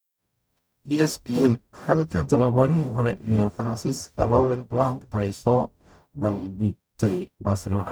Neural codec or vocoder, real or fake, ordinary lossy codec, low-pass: codec, 44.1 kHz, 0.9 kbps, DAC; fake; none; none